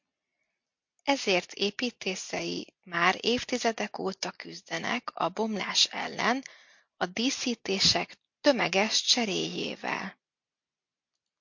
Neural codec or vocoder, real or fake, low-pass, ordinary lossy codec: none; real; 7.2 kHz; MP3, 48 kbps